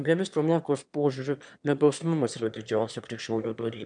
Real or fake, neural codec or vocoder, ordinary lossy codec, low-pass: fake; autoencoder, 22.05 kHz, a latent of 192 numbers a frame, VITS, trained on one speaker; MP3, 96 kbps; 9.9 kHz